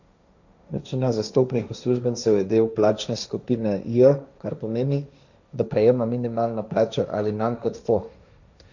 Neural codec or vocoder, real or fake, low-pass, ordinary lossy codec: codec, 16 kHz, 1.1 kbps, Voila-Tokenizer; fake; 7.2 kHz; none